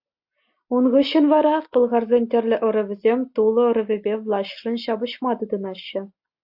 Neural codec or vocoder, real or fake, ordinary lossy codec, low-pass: none; real; AAC, 48 kbps; 5.4 kHz